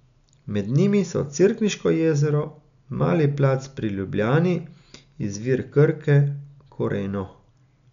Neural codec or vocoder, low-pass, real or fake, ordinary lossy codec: none; 7.2 kHz; real; none